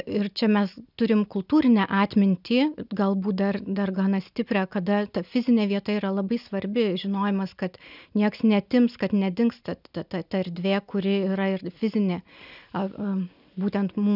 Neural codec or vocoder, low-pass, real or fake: none; 5.4 kHz; real